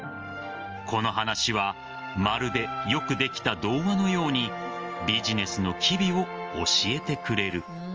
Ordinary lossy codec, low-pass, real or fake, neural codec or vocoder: Opus, 24 kbps; 7.2 kHz; real; none